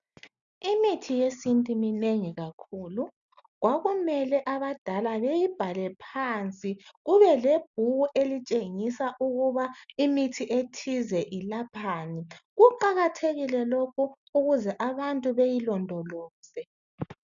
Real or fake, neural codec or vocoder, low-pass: real; none; 7.2 kHz